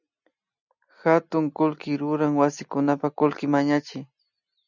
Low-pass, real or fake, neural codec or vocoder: 7.2 kHz; real; none